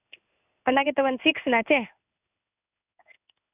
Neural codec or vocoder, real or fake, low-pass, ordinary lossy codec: codec, 16 kHz in and 24 kHz out, 1 kbps, XY-Tokenizer; fake; 3.6 kHz; none